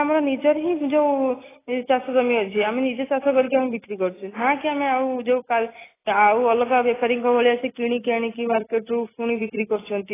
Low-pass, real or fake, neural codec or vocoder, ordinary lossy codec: 3.6 kHz; real; none; AAC, 16 kbps